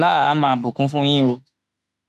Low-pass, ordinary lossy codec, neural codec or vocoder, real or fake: 14.4 kHz; none; autoencoder, 48 kHz, 32 numbers a frame, DAC-VAE, trained on Japanese speech; fake